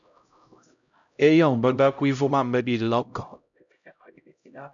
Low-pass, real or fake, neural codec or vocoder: 7.2 kHz; fake; codec, 16 kHz, 0.5 kbps, X-Codec, HuBERT features, trained on LibriSpeech